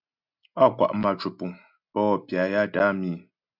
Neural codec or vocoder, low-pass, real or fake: none; 5.4 kHz; real